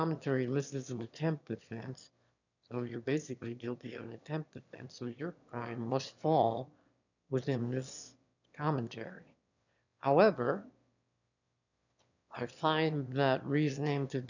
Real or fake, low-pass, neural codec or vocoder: fake; 7.2 kHz; autoencoder, 22.05 kHz, a latent of 192 numbers a frame, VITS, trained on one speaker